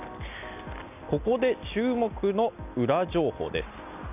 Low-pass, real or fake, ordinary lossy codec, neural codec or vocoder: 3.6 kHz; real; none; none